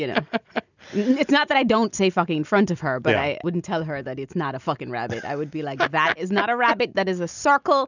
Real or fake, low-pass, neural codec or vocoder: real; 7.2 kHz; none